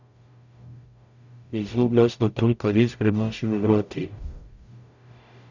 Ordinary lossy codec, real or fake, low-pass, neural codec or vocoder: none; fake; 7.2 kHz; codec, 44.1 kHz, 0.9 kbps, DAC